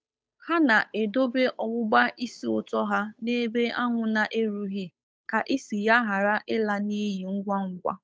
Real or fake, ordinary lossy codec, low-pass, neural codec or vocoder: fake; none; none; codec, 16 kHz, 8 kbps, FunCodec, trained on Chinese and English, 25 frames a second